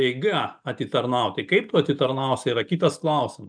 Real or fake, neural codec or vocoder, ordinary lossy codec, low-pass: real; none; MP3, 96 kbps; 9.9 kHz